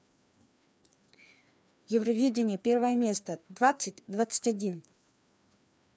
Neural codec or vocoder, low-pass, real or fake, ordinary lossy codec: codec, 16 kHz, 2 kbps, FreqCodec, larger model; none; fake; none